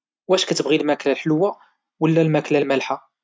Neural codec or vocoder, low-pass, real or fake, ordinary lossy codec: none; none; real; none